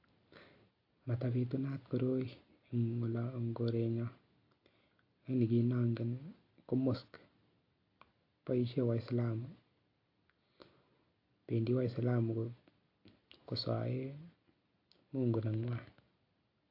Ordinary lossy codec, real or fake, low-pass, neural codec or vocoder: AAC, 32 kbps; real; 5.4 kHz; none